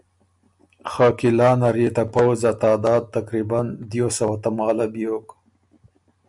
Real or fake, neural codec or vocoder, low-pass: real; none; 10.8 kHz